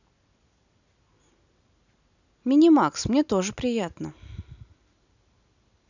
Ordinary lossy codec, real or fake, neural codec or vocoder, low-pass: none; real; none; 7.2 kHz